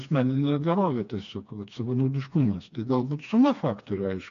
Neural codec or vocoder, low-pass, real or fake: codec, 16 kHz, 2 kbps, FreqCodec, smaller model; 7.2 kHz; fake